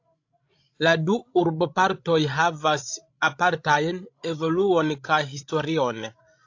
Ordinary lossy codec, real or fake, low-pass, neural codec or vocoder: AAC, 48 kbps; fake; 7.2 kHz; codec, 16 kHz, 16 kbps, FreqCodec, larger model